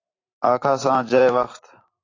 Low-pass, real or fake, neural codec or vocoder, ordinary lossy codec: 7.2 kHz; fake; vocoder, 44.1 kHz, 128 mel bands every 512 samples, BigVGAN v2; AAC, 32 kbps